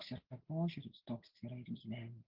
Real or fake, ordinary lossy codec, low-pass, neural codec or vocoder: real; Opus, 16 kbps; 5.4 kHz; none